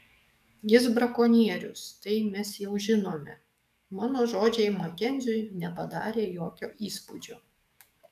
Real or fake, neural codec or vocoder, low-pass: fake; codec, 44.1 kHz, 7.8 kbps, DAC; 14.4 kHz